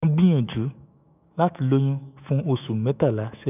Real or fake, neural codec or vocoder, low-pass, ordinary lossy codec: fake; autoencoder, 48 kHz, 128 numbers a frame, DAC-VAE, trained on Japanese speech; 3.6 kHz; none